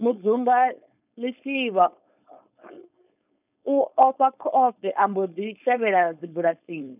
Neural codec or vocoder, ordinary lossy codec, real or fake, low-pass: codec, 16 kHz, 4.8 kbps, FACodec; none; fake; 3.6 kHz